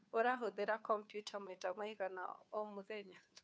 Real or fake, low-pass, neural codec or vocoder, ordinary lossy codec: fake; none; codec, 16 kHz, 2 kbps, FunCodec, trained on Chinese and English, 25 frames a second; none